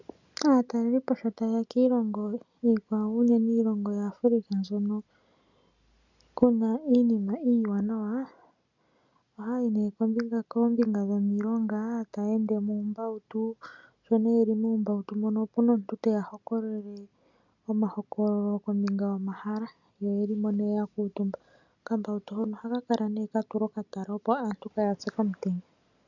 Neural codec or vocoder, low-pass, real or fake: none; 7.2 kHz; real